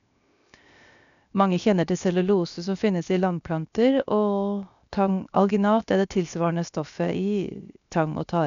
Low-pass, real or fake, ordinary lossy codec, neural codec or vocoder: 7.2 kHz; fake; none; codec, 16 kHz, 0.7 kbps, FocalCodec